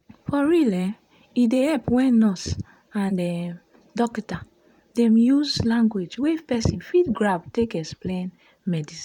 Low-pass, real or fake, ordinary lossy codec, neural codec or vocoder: 19.8 kHz; fake; none; vocoder, 44.1 kHz, 128 mel bands, Pupu-Vocoder